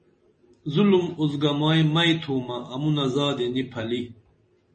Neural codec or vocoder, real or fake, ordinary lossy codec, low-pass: none; real; MP3, 32 kbps; 10.8 kHz